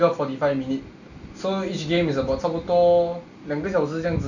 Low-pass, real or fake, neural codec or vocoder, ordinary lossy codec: 7.2 kHz; real; none; none